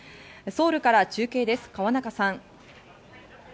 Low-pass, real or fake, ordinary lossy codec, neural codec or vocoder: none; real; none; none